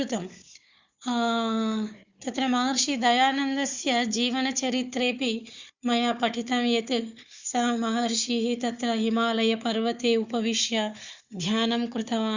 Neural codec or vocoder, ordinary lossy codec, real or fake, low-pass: codec, 16 kHz, 4 kbps, FunCodec, trained on Chinese and English, 50 frames a second; Opus, 64 kbps; fake; 7.2 kHz